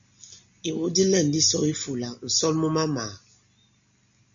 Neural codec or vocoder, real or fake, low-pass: none; real; 7.2 kHz